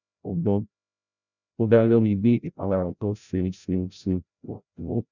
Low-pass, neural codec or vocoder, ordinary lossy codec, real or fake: 7.2 kHz; codec, 16 kHz, 0.5 kbps, FreqCodec, larger model; none; fake